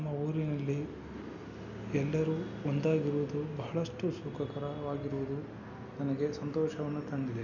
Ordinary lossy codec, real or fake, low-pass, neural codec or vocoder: none; real; 7.2 kHz; none